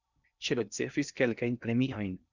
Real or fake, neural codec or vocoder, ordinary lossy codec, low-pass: fake; codec, 16 kHz in and 24 kHz out, 0.6 kbps, FocalCodec, streaming, 2048 codes; Opus, 64 kbps; 7.2 kHz